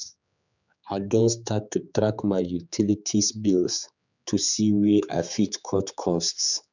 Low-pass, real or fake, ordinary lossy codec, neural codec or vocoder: 7.2 kHz; fake; none; codec, 16 kHz, 4 kbps, X-Codec, HuBERT features, trained on general audio